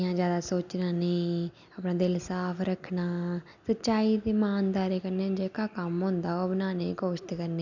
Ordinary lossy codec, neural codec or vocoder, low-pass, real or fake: none; none; 7.2 kHz; real